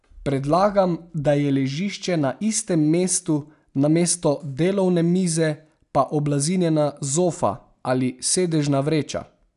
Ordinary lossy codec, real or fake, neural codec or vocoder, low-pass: none; real; none; 10.8 kHz